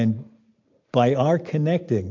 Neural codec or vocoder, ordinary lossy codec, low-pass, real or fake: none; MP3, 48 kbps; 7.2 kHz; real